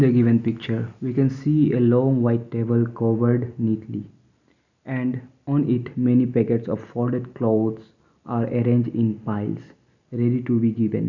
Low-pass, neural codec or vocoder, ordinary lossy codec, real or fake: 7.2 kHz; none; none; real